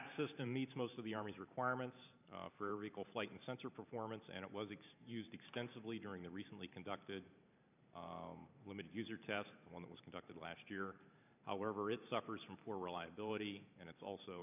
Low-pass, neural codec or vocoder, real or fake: 3.6 kHz; vocoder, 44.1 kHz, 128 mel bands every 512 samples, BigVGAN v2; fake